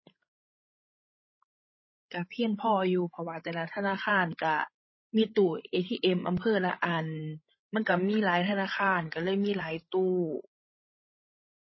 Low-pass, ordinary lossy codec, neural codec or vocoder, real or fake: 7.2 kHz; MP3, 24 kbps; codec, 16 kHz, 16 kbps, FreqCodec, larger model; fake